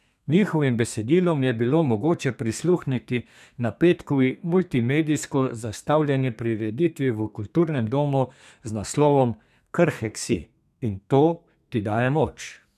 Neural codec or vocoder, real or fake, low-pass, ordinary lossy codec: codec, 32 kHz, 1.9 kbps, SNAC; fake; 14.4 kHz; none